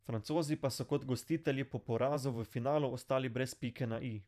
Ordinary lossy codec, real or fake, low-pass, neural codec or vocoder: none; fake; 14.4 kHz; vocoder, 44.1 kHz, 128 mel bands every 512 samples, BigVGAN v2